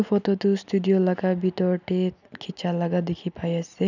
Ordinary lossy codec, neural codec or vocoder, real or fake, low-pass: none; none; real; 7.2 kHz